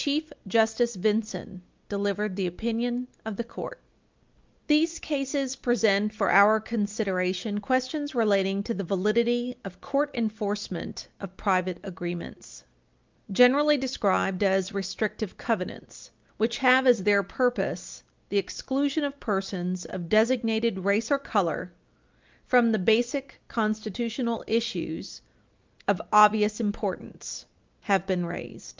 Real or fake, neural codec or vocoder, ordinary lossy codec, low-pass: real; none; Opus, 24 kbps; 7.2 kHz